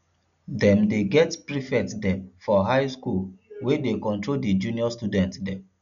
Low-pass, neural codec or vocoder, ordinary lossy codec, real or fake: 7.2 kHz; none; none; real